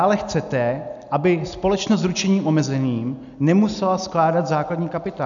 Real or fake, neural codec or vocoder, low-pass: real; none; 7.2 kHz